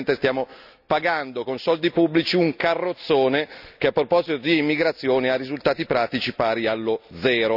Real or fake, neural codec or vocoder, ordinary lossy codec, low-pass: real; none; none; 5.4 kHz